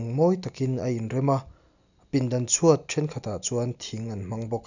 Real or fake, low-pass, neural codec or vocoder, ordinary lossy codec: real; 7.2 kHz; none; none